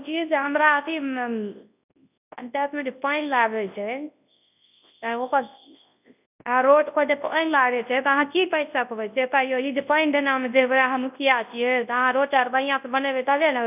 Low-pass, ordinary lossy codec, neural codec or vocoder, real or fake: 3.6 kHz; none; codec, 24 kHz, 0.9 kbps, WavTokenizer, large speech release; fake